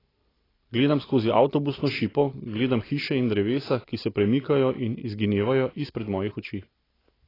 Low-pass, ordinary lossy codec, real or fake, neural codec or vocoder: 5.4 kHz; AAC, 24 kbps; real; none